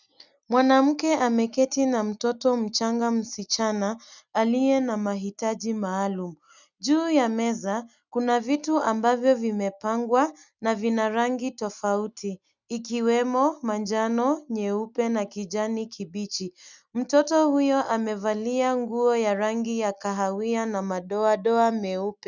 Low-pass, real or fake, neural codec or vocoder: 7.2 kHz; real; none